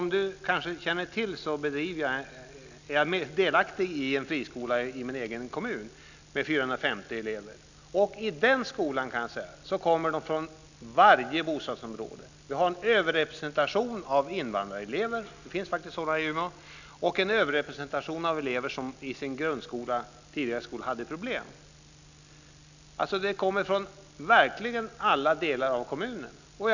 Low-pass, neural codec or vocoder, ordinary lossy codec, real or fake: 7.2 kHz; none; none; real